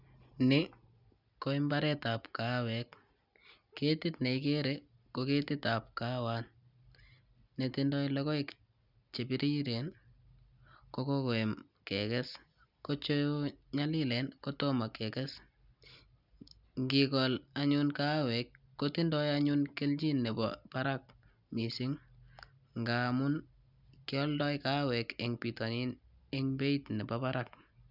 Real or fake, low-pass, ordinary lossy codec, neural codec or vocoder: real; 5.4 kHz; none; none